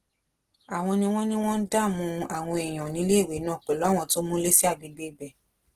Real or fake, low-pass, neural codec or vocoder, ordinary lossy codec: real; 14.4 kHz; none; Opus, 16 kbps